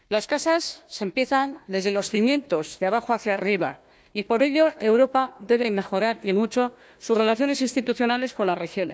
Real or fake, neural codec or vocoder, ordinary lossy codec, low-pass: fake; codec, 16 kHz, 1 kbps, FunCodec, trained on Chinese and English, 50 frames a second; none; none